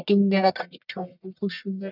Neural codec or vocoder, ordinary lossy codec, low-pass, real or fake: codec, 44.1 kHz, 1.7 kbps, Pupu-Codec; none; 5.4 kHz; fake